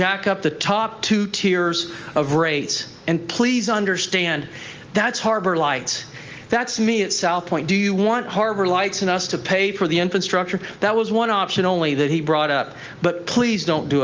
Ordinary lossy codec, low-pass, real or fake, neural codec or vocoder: Opus, 24 kbps; 7.2 kHz; real; none